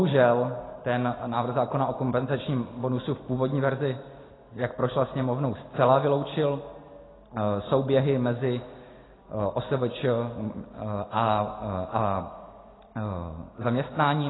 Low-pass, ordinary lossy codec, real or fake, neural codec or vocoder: 7.2 kHz; AAC, 16 kbps; real; none